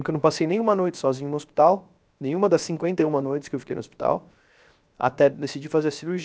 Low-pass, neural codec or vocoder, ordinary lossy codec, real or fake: none; codec, 16 kHz, 0.7 kbps, FocalCodec; none; fake